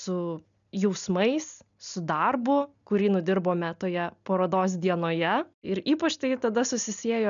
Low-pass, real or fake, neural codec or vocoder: 7.2 kHz; real; none